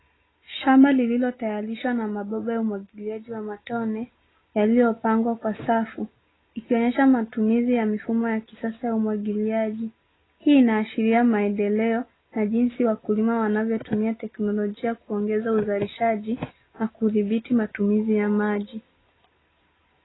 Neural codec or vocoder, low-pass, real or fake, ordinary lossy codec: none; 7.2 kHz; real; AAC, 16 kbps